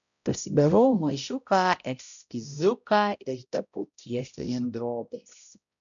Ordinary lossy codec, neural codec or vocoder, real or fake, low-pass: MP3, 96 kbps; codec, 16 kHz, 0.5 kbps, X-Codec, HuBERT features, trained on balanced general audio; fake; 7.2 kHz